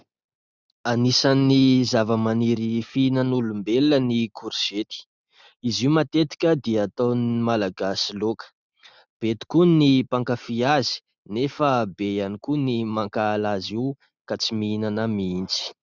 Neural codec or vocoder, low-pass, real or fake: none; 7.2 kHz; real